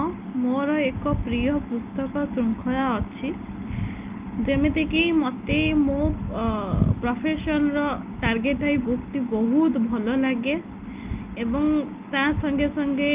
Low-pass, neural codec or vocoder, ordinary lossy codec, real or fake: 3.6 kHz; none; Opus, 32 kbps; real